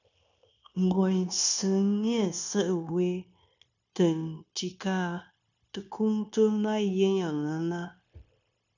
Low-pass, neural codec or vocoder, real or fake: 7.2 kHz; codec, 16 kHz, 0.9 kbps, LongCat-Audio-Codec; fake